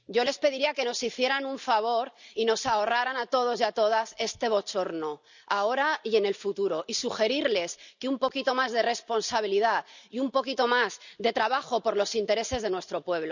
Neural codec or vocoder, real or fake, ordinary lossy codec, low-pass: none; real; none; 7.2 kHz